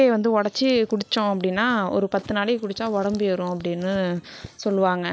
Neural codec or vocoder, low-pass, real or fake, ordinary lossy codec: none; none; real; none